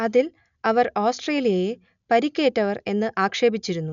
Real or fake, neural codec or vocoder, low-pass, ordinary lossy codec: real; none; 7.2 kHz; none